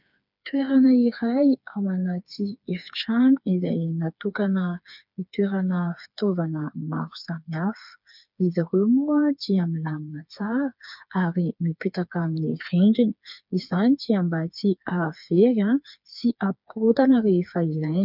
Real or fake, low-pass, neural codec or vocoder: fake; 5.4 kHz; codec, 16 kHz, 4 kbps, FreqCodec, smaller model